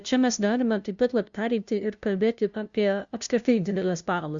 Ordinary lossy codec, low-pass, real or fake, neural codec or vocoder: Opus, 64 kbps; 7.2 kHz; fake; codec, 16 kHz, 0.5 kbps, FunCodec, trained on LibriTTS, 25 frames a second